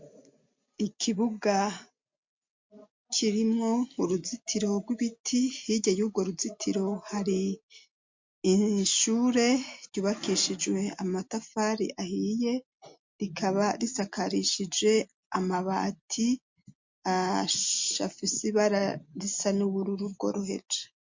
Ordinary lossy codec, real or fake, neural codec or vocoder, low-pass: MP3, 48 kbps; real; none; 7.2 kHz